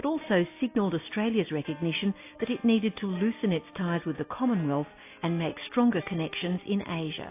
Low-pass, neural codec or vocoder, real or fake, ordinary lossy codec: 3.6 kHz; none; real; AAC, 24 kbps